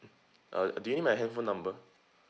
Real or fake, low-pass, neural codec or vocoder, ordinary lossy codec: real; none; none; none